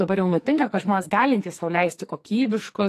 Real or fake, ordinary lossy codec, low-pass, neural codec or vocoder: fake; AAC, 64 kbps; 14.4 kHz; codec, 44.1 kHz, 2.6 kbps, SNAC